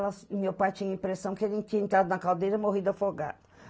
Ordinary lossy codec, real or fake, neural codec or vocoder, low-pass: none; real; none; none